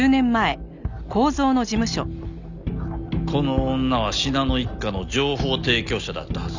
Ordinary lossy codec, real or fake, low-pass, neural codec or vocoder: none; real; 7.2 kHz; none